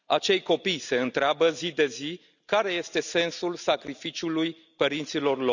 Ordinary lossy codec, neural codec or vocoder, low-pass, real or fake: none; none; 7.2 kHz; real